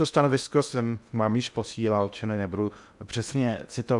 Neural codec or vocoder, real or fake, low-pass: codec, 16 kHz in and 24 kHz out, 0.6 kbps, FocalCodec, streaming, 2048 codes; fake; 10.8 kHz